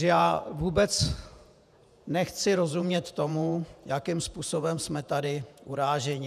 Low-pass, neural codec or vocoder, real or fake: 14.4 kHz; vocoder, 48 kHz, 128 mel bands, Vocos; fake